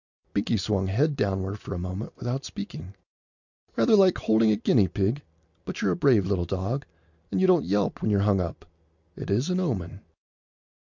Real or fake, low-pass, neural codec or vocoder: real; 7.2 kHz; none